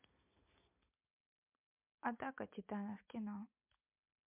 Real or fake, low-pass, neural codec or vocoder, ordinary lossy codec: real; 3.6 kHz; none; MP3, 32 kbps